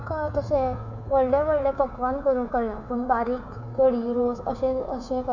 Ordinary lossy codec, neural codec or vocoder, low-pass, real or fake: none; codec, 24 kHz, 3.1 kbps, DualCodec; 7.2 kHz; fake